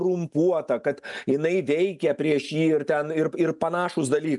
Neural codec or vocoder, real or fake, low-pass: none; real; 10.8 kHz